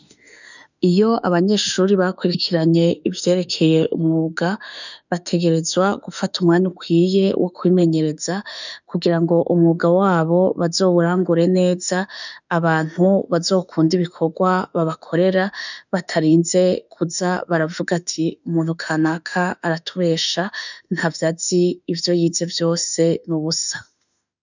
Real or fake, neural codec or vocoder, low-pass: fake; autoencoder, 48 kHz, 32 numbers a frame, DAC-VAE, trained on Japanese speech; 7.2 kHz